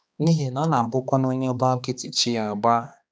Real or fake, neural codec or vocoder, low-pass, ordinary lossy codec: fake; codec, 16 kHz, 2 kbps, X-Codec, HuBERT features, trained on balanced general audio; none; none